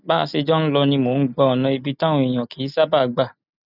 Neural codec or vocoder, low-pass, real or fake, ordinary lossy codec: none; 5.4 kHz; real; none